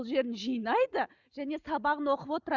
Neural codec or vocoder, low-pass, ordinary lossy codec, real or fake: none; 7.2 kHz; Opus, 64 kbps; real